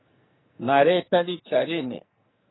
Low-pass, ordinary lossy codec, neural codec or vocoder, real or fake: 7.2 kHz; AAC, 16 kbps; codec, 44.1 kHz, 2.6 kbps, SNAC; fake